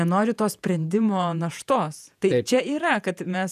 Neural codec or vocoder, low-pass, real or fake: vocoder, 48 kHz, 128 mel bands, Vocos; 14.4 kHz; fake